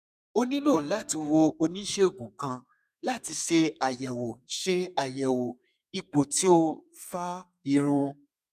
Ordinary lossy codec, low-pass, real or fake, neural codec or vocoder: none; 14.4 kHz; fake; codec, 32 kHz, 1.9 kbps, SNAC